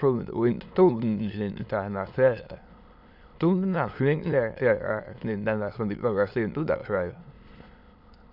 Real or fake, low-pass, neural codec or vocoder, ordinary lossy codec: fake; 5.4 kHz; autoencoder, 22.05 kHz, a latent of 192 numbers a frame, VITS, trained on many speakers; Opus, 64 kbps